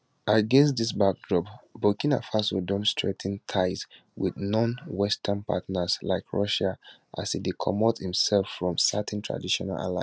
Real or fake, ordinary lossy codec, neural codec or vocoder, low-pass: real; none; none; none